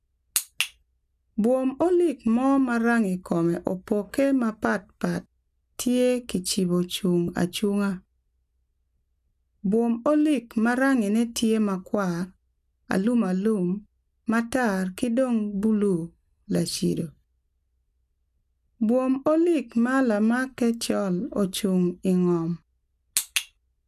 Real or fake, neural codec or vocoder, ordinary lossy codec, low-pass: real; none; none; 14.4 kHz